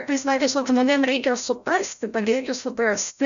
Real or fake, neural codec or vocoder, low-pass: fake; codec, 16 kHz, 0.5 kbps, FreqCodec, larger model; 7.2 kHz